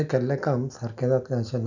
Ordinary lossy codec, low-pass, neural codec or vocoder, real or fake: none; 7.2 kHz; none; real